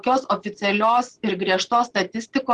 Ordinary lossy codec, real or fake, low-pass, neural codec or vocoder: Opus, 16 kbps; real; 10.8 kHz; none